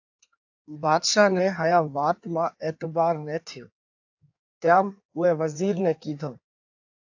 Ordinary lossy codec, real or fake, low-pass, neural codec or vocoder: AAC, 48 kbps; fake; 7.2 kHz; codec, 16 kHz in and 24 kHz out, 1.1 kbps, FireRedTTS-2 codec